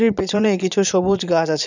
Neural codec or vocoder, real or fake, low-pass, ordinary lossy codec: vocoder, 22.05 kHz, 80 mel bands, Vocos; fake; 7.2 kHz; none